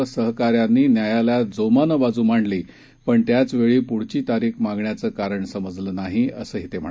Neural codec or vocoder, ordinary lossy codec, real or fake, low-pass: none; none; real; none